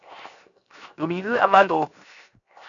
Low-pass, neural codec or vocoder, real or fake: 7.2 kHz; codec, 16 kHz, 0.7 kbps, FocalCodec; fake